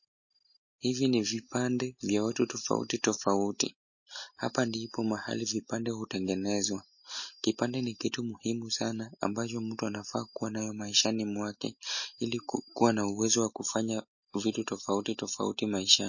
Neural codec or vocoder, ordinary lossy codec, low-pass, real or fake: none; MP3, 32 kbps; 7.2 kHz; real